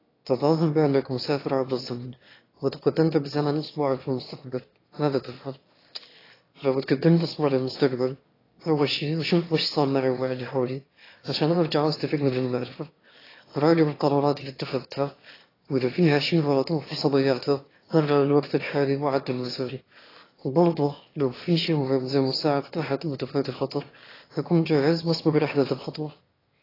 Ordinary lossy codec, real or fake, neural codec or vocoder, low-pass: AAC, 24 kbps; fake; autoencoder, 22.05 kHz, a latent of 192 numbers a frame, VITS, trained on one speaker; 5.4 kHz